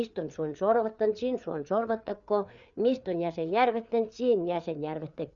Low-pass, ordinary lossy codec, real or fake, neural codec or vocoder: 7.2 kHz; none; fake; codec, 16 kHz, 4 kbps, FreqCodec, larger model